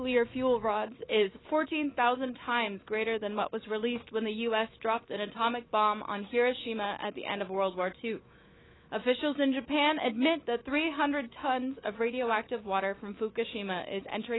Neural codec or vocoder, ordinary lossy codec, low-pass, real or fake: autoencoder, 48 kHz, 128 numbers a frame, DAC-VAE, trained on Japanese speech; AAC, 16 kbps; 7.2 kHz; fake